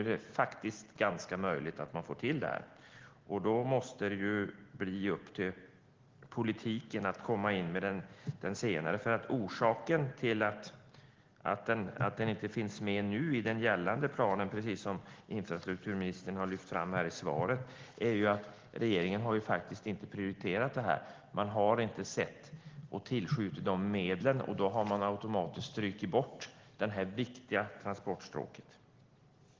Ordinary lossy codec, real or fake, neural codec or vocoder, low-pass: Opus, 16 kbps; real; none; 7.2 kHz